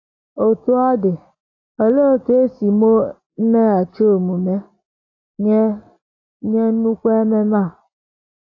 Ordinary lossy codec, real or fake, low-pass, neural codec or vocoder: AAC, 32 kbps; real; 7.2 kHz; none